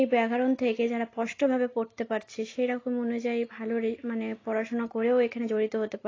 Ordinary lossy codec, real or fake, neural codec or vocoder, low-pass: AAC, 32 kbps; real; none; 7.2 kHz